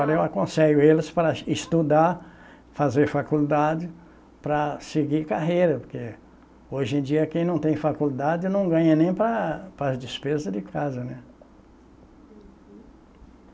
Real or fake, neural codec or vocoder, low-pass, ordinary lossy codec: real; none; none; none